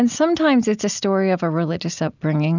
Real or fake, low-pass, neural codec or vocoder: real; 7.2 kHz; none